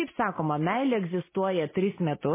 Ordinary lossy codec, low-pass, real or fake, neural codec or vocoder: MP3, 16 kbps; 3.6 kHz; real; none